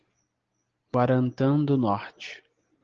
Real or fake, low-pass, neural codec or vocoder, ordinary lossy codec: real; 7.2 kHz; none; Opus, 16 kbps